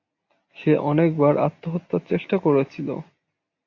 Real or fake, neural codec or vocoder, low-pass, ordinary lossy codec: real; none; 7.2 kHz; AAC, 48 kbps